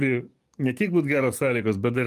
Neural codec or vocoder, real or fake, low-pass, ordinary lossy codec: codec, 44.1 kHz, 7.8 kbps, DAC; fake; 14.4 kHz; Opus, 32 kbps